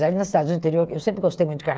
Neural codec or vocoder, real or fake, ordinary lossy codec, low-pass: codec, 16 kHz, 8 kbps, FreqCodec, smaller model; fake; none; none